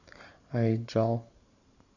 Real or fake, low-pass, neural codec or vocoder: fake; 7.2 kHz; vocoder, 44.1 kHz, 128 mel bands every 512 samples, BigVGAN v2